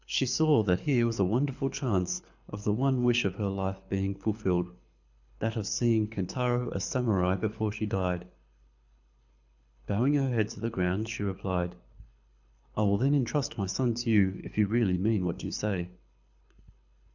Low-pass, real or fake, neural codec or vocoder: 7.2 kHz; fake; codec, 24 kHz, 6 kbps, HILCodec